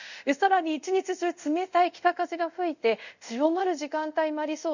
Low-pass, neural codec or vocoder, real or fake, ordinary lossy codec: 7.2 kHz; codec, 24 kHz, 0.5 kbps, DualCodec; fake; none